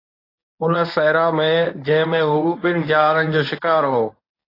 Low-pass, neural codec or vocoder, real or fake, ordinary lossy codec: 5.4 kHz; codec, 24 kHz, 0.9 kbps, WavTokenizer, medium speech release version 1; fake; AAC, 24 kbps